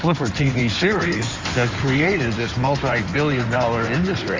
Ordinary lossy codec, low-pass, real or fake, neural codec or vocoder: Opus, 32 kbps; 7.2 kHz; fake; codec, 16 kHz in and 24 kHz out, 2.2 kbps, FireRedTTS-2 codec